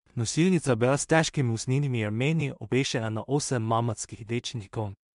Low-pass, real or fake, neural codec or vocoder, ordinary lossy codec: 10.8 kHz; fake; codec, 16 kHz in and 24 kHz out, 0.4 kbps, LongCat-Audio-Codec, two codebook decoder; MP3, 64 kbps